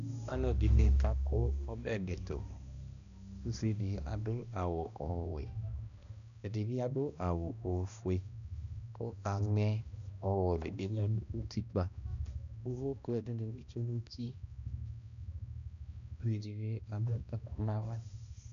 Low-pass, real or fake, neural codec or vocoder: 7.2 kHz; fake; codec, 16 kHz, 1 kbps, X-Codec, HuBERT features, trained on balanced general audio